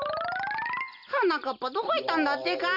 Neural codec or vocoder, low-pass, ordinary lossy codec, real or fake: none; 5.4 kHz; none; real